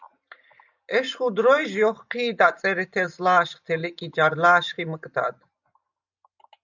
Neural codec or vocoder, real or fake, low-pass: none; real; 7.2 kHz